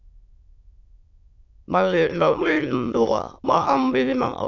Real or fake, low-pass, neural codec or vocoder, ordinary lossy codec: fake; 7.2 kHz; autoencoder, 22.05 kHz, a latent of 192 numbers a frame, VITS, trained on many speakers; AAC, 48 kbps